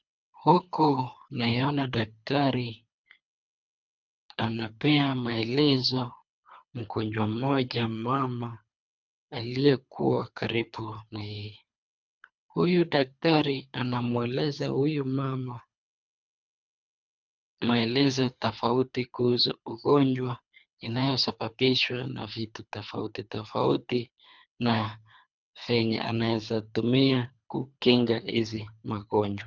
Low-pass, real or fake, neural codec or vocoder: 7.2 kHz; fake; codec, 24 kHz, 3 kbps, HILCodec